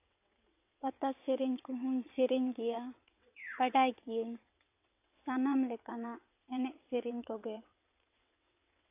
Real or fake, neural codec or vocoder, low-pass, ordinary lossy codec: fake; vocoder, 22.05 kHz, 80 mel bands, WaveNeXt; 3.6 kHz; AAC, 32 kbps